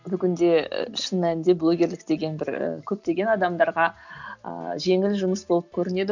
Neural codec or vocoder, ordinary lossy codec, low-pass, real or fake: none; none; 7.2 kHz; real